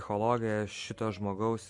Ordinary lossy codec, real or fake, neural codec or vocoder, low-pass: MP3, 48 kbps; real; none; 10.8 kHz